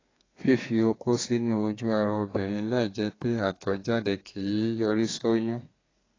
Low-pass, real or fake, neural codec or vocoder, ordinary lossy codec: 7.2 kHz; fake; codec, 32 kHz, 1.9 kbps, SNAC; AAC, 32 kbps